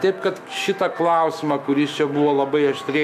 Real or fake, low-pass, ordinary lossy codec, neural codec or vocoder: fake; 14.4 kHz; MP3, 96 kbps; autoencoder, 48 kHz, 128 numbers a frame, DAC-VAE, trained on Japanese speech